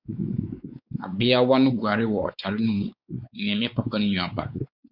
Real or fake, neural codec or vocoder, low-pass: fake; codec, 16 kHz, 4 kbps, X-Codec, WavLM features, trained on Multilingual LibriSpeech; 5.4 kHz